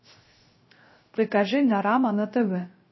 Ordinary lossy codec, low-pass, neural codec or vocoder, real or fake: MP3, 24 kbps; 7.2 kHz; codec, 16 kHz, 0.3 kbps, FocalCodec; fake